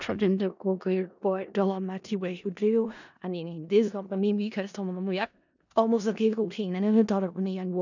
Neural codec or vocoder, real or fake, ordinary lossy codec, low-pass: codec, 16 kHz in and 24 kHz out, 0.4 kbps, LongCat-Audio-Codec, four codebook decoder; fake; none; 7.2 kHz